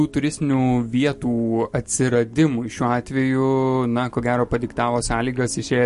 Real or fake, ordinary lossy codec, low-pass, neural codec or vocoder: real; MP3, 48 kbps; 14.4 kHz; none